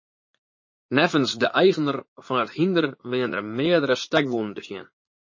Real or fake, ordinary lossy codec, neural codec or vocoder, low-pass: fake; MP3, 32 kbps; vocoder, 44.1 kHz, 80 mel bands, Vocos; 7.2 kHz